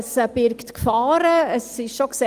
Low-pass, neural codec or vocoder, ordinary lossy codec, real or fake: 14.4 kHz; none; Opus, 24 kbps; real